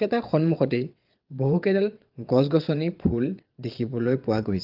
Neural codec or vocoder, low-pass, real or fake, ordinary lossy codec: vocoder, 22.05 kHz, 80 mel bands, WaveNeXt; 5.4 kHz; fake; Opus, 24 kbps